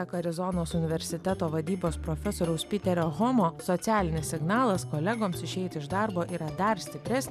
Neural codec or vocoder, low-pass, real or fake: none; 14.4 kHz; real